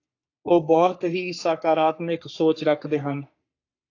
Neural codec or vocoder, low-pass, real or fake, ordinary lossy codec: codec, 44.1 kHz, 3.4 kbps, Pupu-Codec; 7.2 kHz; fake; AAC, 48 kbps